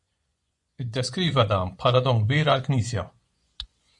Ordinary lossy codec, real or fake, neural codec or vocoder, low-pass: MP3, 64 kbps; fake; vocoder, 24 kHz, 100 mel bands, Vocos; 10.8 kHz